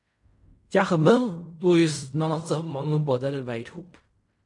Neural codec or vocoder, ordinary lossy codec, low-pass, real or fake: codec, 16 kHz in and 24 kHz out, 0.4 kbps, LongCat-Audio-Codec, fine tuned four codebook decoder; MP3, 64 kbps; 10.8 kHz; fake